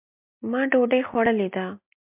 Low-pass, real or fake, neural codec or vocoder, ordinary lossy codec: 3.6 kHz; real; none; MP3, 32 kbps